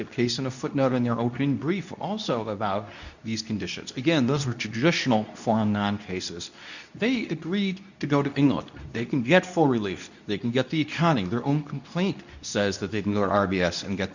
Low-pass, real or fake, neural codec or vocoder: 7.2 kHz; fake; codec, 24 kHz, 0.9 kbps, WavTokenizer, medium speech release version 2